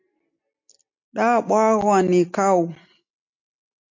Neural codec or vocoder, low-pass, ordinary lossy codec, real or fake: none; 7.2 kHz; MP3, 48 kbps; real